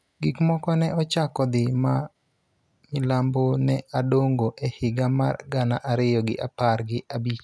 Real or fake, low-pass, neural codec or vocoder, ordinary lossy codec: real; none; none; none